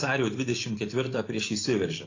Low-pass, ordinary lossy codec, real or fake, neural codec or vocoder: 7.2 kHz; AAC, 32 kbps; real; none